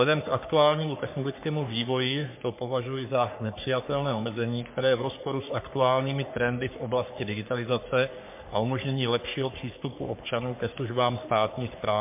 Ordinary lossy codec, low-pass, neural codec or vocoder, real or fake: MP3, 32 kbps; 3.6 kHz; codec, 44.1 kHz, 3.4 kbps, Pupu-Codec; fake